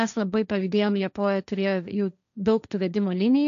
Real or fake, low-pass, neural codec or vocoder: fake; 7.2 kHz; codec, 16 kHz, 1.1 kbps, Voila-Tokenizer